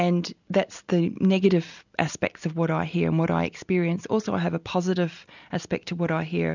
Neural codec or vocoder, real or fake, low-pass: none; real; 7.2 kHz